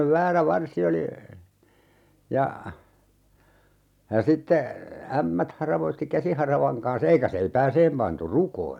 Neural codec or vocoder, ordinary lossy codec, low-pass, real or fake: none; none; 19.8 kHz; real